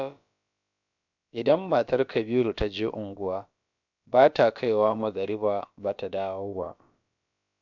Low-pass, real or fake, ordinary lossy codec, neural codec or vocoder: 7.2 kHz; fake; none; codec, 16 kHz, about 1 kbps, DyCAST, with the encoder's durations